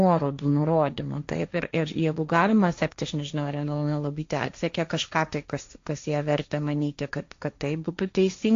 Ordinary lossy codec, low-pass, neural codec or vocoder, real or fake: AAC, 48 kbps; 7.2 kHz; codec, 16 kHz, 1.1 kbps, Voila-Tokenizer; fake